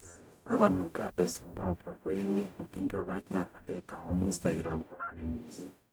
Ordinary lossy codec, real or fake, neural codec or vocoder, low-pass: none; fake; codec, 44.1 kHz, 0.9 kbps, DAC; none